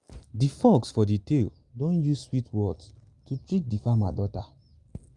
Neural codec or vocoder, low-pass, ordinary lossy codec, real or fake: none; 10.8 kHz; Opus, 32 kbps; real